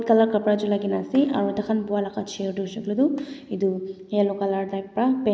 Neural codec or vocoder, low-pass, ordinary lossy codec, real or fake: none; none; none; real